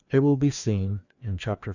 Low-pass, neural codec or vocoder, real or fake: 7.2 kHz; codec, 16 kHz, 2 kbps, FreqCodec, larger model; fake